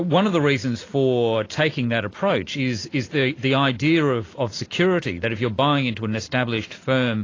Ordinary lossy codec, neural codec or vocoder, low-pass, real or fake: AAC, 32 kbps; none; 7.2 kHz; real